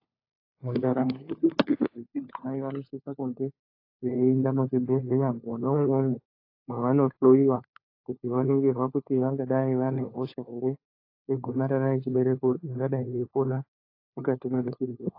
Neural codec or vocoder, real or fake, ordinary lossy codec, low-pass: codec, 16 kHz, 4 kbps, FunCodec, trained on LibriTTS, 50 frames a second; fake; AAC, 32 kbps; 5.4 kHz